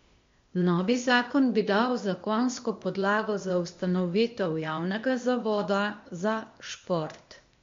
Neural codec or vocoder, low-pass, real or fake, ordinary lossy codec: codec, 16 kHz, 0.8 kbps, ZipCodec; 7.2 kHz; fake; MP3, 48 kbps